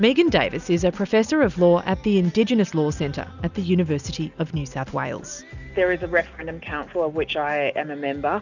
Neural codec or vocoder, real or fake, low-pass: none; real; 7.2 kHz